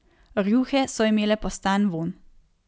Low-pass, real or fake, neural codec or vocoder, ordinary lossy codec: none; real; none; none